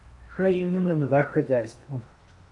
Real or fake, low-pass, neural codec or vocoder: fake; 10.8 kHz; codec, 16 kHz in and 24 kHz out, 0.8 kbps, FocalCodec, streaming, 65536 codes